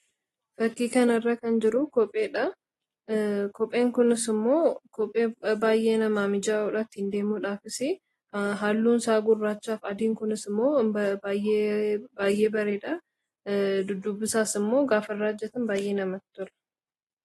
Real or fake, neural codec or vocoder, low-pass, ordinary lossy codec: real; none; 19.8 kHz; AAC, 32 kbps